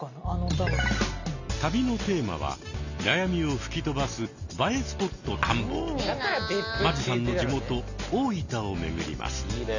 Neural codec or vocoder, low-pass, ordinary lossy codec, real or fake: none; 7.2 kHz; none; real